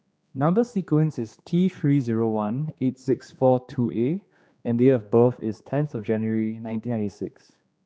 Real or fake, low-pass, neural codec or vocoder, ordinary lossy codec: fake; none; codec, 16 kHz, 2 kbps, X-Codec, HuBERT features, trained on general audio; none